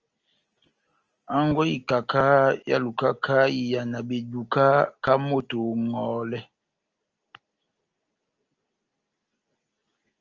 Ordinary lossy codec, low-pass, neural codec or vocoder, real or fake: Opus, 24 kbps; 7.2 kHz; none; real